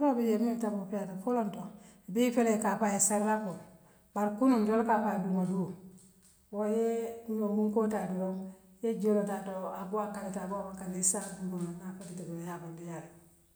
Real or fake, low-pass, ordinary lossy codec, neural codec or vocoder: real; none; none; none